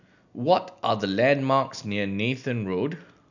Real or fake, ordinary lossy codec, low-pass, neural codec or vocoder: real; none; 7.2 kHz; none